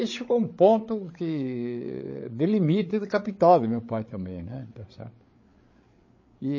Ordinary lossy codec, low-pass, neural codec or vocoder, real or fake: MP3, 32 kbps; 7.2 kHz; codec, 16 kHz, 8 kbps, FreqCodec, larger model; fake